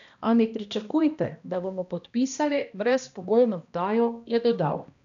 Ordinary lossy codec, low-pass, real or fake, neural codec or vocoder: none; 7.2 kHz; fake; codec, 16 kHz, 1 kbps, X-Codec, HuBERT features, trained on balanced general audio